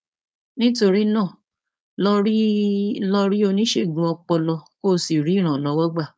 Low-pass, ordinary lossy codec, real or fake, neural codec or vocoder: none; none; fake; codec, 16 kHz, 4.8 kbps, FACodec